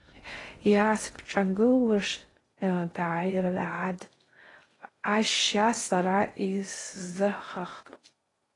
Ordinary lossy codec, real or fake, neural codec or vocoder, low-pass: AAC, 32 kbps; fake; codec, 16 kHz in and 24 kHz out, 0.6 kbps, FocalCodec, streaming, 2048 codes; 10.8 kHz